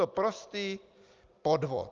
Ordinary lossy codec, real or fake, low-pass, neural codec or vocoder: Opus, 24 kbps; real; 7.2 kHz; none